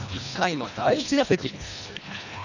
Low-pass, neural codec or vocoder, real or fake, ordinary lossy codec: 7.2 kHz; codec, 24 kHz, 1.5 kbps, HILCodec; fake; none